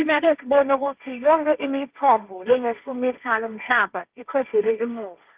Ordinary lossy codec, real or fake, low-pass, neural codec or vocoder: Opus, 24 kbps; fake; 3.6 kHz; codec, 16 kHz, 1.1 kbps, Voila-Tokenizer